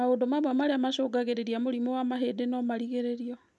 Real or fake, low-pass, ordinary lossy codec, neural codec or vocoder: real; none; none; none